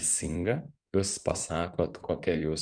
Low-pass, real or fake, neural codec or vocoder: 9.9 kHz; fake; codec, 16 kHz in and 24 kHz out, 2.2 kbps, FireRedTTS-2 codec